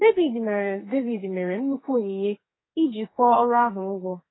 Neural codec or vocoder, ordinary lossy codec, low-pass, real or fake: codec, 44.1 kHz, 2.6 kbps, SNAC; AAC, 16 kbps; 7.2 kHz; fake